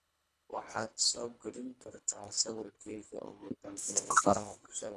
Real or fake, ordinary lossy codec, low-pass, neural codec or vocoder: fake; none; none; codec, 24 kHz, 1.5 kbps, HILCodec